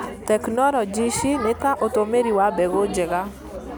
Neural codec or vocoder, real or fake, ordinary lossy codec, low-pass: none; real; none; none